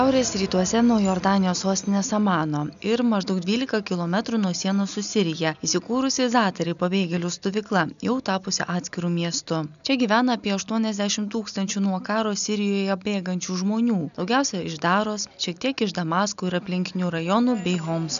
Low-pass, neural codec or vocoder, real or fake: 7.2 kHz; none; real